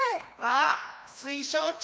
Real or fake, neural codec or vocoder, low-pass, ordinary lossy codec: fake; codec, 16 kHz, 1 kbps, FreqCodec, larger model; none; none